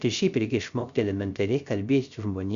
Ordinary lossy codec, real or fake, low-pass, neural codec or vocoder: Opus, 64 kbps; fake; 7.2 kHz; codec, 16 kHz, 0.3 kbps, FocalCodec